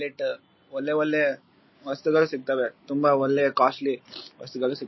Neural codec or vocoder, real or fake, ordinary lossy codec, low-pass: none; real; MP3, 24 kbps; 7.2 kHz